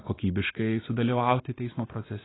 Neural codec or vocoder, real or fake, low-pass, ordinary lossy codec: none; real; 7.2 kHz; AAC, 16 kbps